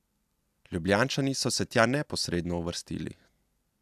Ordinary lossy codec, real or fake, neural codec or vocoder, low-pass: AAC, 96 kbps; real; none; 14.4 kHz